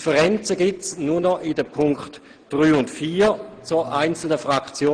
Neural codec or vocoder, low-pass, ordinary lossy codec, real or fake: none; 9.9 kHz; Opus, 16 kbps; real